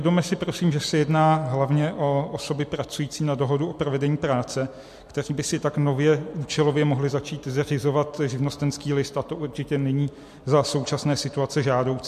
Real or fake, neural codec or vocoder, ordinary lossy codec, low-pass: real; none; MP3, 64 kbps; 14.4 kHz